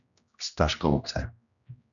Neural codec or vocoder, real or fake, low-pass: codec, 16 kHz, 1 kbps, X-Codec, HuBERT features, trained on general audio; fake; 7.2 kHz